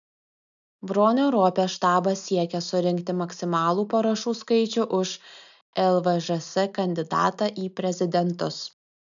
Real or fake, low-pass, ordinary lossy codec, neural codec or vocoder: real; 7.2 kHz; MP3, 96 kbps; none